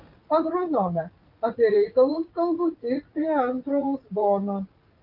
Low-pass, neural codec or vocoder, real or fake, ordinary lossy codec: 5.4 kHz; vocoder, 44.1 kHz, 80 mel bands, Vocos; fake; Opus, 24 kbps